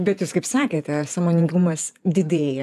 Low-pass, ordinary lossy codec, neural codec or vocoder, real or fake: 14.4 kHz; AAC, 96 kbps; vocoder, 48 kHz, 128 mel bands, Vocos; fake